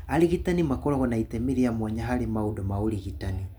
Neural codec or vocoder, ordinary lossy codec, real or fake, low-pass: none; none; real; none